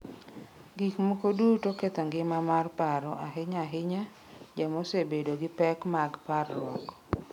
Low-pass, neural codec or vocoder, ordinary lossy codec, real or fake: 19.8 kHz; none; none; real